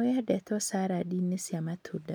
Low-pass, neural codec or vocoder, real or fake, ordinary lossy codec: none; none; real; none